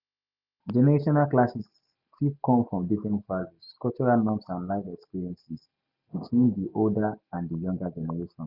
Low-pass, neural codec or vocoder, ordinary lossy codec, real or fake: 5.4 kHz; none; none; real